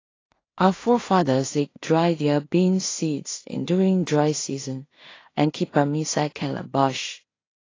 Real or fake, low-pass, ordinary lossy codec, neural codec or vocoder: fake; 7.2 kHz; AAC, 32 kbps; codec, 16 kHz in and 24 kHz out, 0.4 kbps, LongCat-Audio-Codec, two codebook decoder